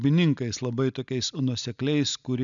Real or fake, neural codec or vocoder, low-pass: real; none; 7.2 kHz